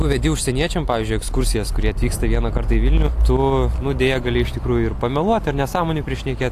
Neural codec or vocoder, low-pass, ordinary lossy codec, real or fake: none; 14.4 kHz; AAC, 64 kbps; real